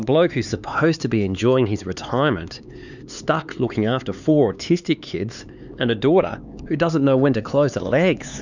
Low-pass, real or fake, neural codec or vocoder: 7.2 kHz; fake; codec, 16 kHz, 4 kbps, X-Codec, HuBERT features, trained on LibriSpeech